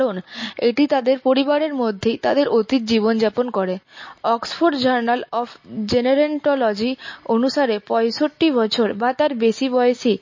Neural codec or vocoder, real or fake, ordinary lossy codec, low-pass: none; real; MP3, 32 kbps; 7.2 kHz